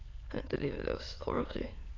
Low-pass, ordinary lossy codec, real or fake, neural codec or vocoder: 7.2 kHz; AAC, 32 kbps; fake; autoencoder, 22.05 kHz, a latent of 192 numbers a frame, VITS, trained on many speakers